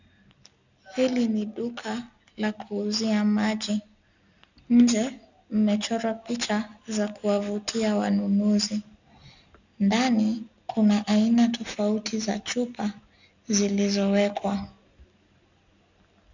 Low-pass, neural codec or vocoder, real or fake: 7.2 kHz; none; real